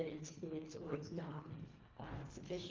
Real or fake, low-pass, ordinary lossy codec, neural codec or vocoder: fake; 7.2 kHz; Opus, 32 kbps; codec, 24 kHz, 1.5 kbps, HILCodec